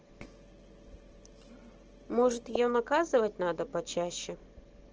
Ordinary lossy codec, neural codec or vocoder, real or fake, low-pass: Opus, 16 kbps; none; real; 7.2 kHz